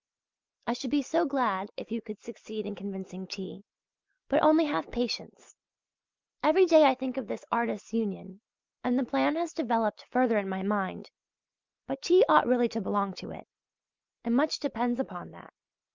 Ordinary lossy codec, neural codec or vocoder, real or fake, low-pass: Opus, 32 kbps; none; real; 7.2 kHz